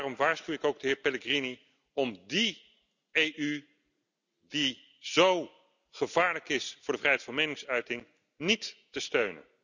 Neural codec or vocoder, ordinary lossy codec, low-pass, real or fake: none; none; 7.2 kHz; real